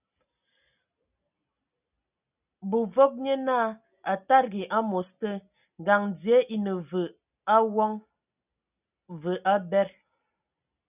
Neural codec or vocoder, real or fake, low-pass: none; real; 3.6 kHz